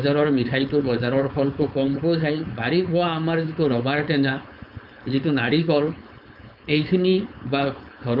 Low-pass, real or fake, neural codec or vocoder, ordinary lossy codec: 5.4 kHz; fake; codec, 16 kHz, 4.8 kbps, FACodec; none